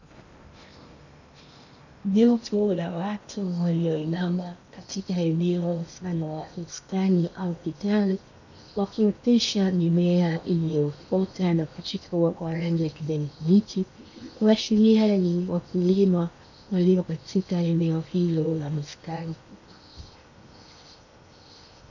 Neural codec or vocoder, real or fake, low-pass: codec, 16 kHz in and 24 kHz out, 0.8 kbps, FocalCodec, streaming, 65536 codes; fake; 7.2 kHz